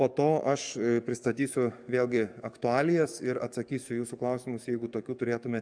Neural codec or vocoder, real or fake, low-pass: codec, 44.1 kHz, 7.8 kbps, DAC; fake; 9.9 kHz